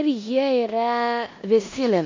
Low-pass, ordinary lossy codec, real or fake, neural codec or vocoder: 7.2 kHz; MP3, 64 kbps; fake; codec, 16 kHz in and 24 kHz out, 0.9 kbps, LongCat-Audio-Codec, four codebook decoder